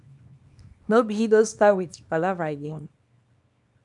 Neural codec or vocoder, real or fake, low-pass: codec, 24 kHz, 0.9 kbps, WavTokenizer, small release; fake; 10.8 kHz